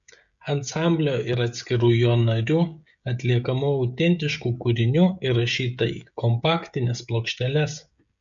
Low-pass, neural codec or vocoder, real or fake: 7.2 kHz; codec, 16 kHz, 16 kbps, FreqCodec, smaller model; fake